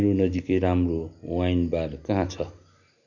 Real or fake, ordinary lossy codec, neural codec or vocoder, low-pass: real; none; none; 7.2 kHz